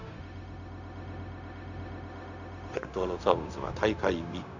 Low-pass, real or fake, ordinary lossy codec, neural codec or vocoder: 7.2 kHz; fake; none; codec, 16 kHz, 0.4 kbps, LongCat-Audio-Codec